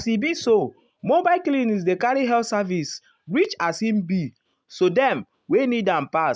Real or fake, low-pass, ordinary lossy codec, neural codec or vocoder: real; none; none; none